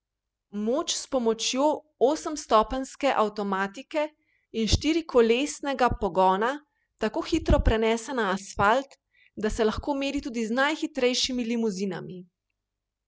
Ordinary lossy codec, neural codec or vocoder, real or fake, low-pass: none; none; real; none